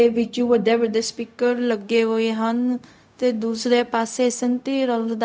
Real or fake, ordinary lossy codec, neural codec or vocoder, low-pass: fake; none; codec, 16 kHz, 0.4 kbps, LongCat-Audio-Codec; none